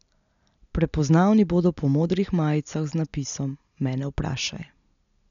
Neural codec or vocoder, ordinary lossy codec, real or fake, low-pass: none; none; real; 7.2 kHz